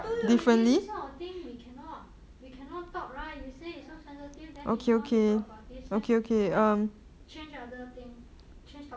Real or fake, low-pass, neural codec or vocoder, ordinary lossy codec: real; none; none; none